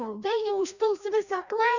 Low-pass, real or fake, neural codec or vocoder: 7.2 kHz; fake; codec, 16 kHz, 1 kbps, FreqCodec, larger model